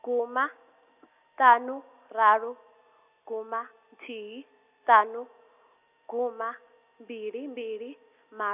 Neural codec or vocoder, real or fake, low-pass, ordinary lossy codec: none; real; 3.6 kHz; none